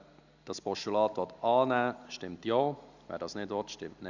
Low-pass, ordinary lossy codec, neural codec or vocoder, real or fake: 7.2 kHz; Opus, 64 kbps; none; real